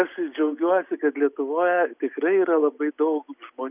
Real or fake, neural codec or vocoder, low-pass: real; none; 3.6 kHz